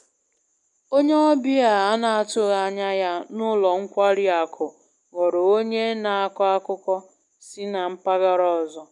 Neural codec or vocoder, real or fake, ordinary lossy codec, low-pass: none; real; none; 10.8 kHz